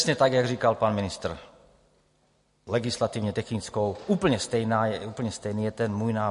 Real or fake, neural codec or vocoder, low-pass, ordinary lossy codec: real; none; 10.8 kHz; MP3, 48 kbps